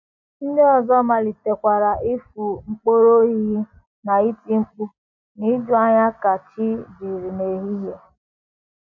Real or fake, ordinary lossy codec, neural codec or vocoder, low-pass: real; none; none; 7.2 kHz